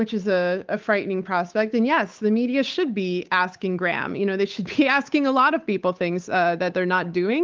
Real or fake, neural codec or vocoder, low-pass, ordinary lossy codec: fake; codec, 16 kHz, 8 kbps, FunCodec, trained on Chinese and English, 25 frames a second; 7.2 kHz; Opus, 32 kbps